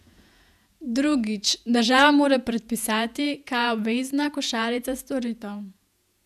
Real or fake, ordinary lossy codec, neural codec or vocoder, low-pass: fake; none; vocoder, 48 kHz, 128 mel bands, Vocos; 14.4 kHz